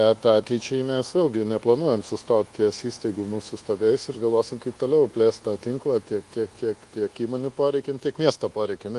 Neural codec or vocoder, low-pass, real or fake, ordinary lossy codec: codec, 24 kHz, 1.2 kbps, DualCodec; 10.8 kHz; fake; Opus, 24 kbps